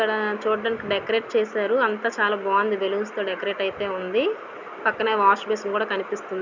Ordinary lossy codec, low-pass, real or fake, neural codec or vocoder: none; 7.2 kHz; real; none